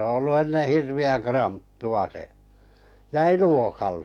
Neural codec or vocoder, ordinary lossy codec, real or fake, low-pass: codec, 44.1 kHz, 7.8 kbps, DAC; none; fake; 19.8 kHz